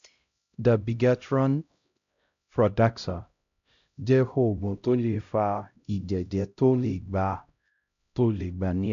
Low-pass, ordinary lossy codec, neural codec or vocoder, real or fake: 7.2 kHz; MP3, 64 kbps; codec, 16 kHz, 0.5 kbps, X-Codec, HuBERT features, trained on LibriSpeech; fake